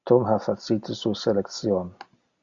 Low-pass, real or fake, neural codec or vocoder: 7.2 kHz; real; none